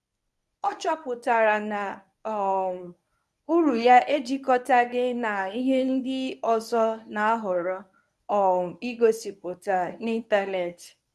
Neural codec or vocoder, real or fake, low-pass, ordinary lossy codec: codec, 24 kHz, 0.9 kbps, WavTokenizer, medium speech release version 1; fake; none; none